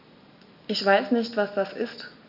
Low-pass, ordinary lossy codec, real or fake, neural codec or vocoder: 5.4 kHz; AAC, 48 kbps; real; none